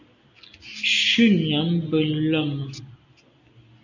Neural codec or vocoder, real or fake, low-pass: none; real; 7.2 kHz